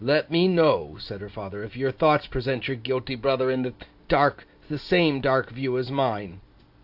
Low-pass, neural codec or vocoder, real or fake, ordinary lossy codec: 5.4 kHz; none; real; AAC, 48 kbps